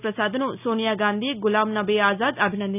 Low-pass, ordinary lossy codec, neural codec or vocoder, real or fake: 3.6 kHz; none; none; real